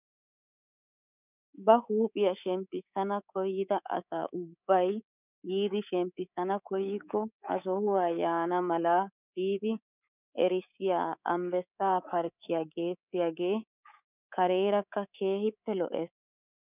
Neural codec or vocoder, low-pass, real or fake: codec, 24 kHz, 3.1 kbps, DualCodec; 3.6 kHz; fake